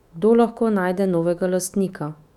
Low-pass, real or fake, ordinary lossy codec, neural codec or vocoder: 19.8 kHz; fake; none; autoencoder, 48 kHz, 128 numbers a frame, DAC-VAE, trained on Japanese speech